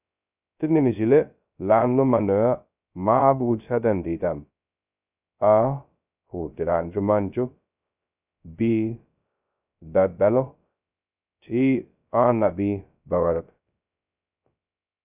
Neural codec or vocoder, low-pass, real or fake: codec, 16 kHz, 0.2 kbps, FocalCodec; 3.6 kHz; fake